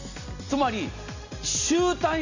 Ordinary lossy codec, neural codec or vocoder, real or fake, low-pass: none; none; real; 7.2 kHz